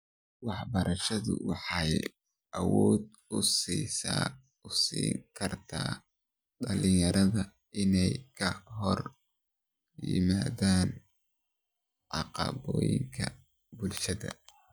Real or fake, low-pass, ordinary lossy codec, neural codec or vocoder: real; none; none; none